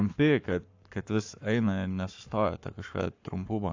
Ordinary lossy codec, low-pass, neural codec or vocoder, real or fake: AAC, 48 kbps; 7.2 kHz; codec, 44.1 kHz, 7.8 kbps, Pupu-Codec; fake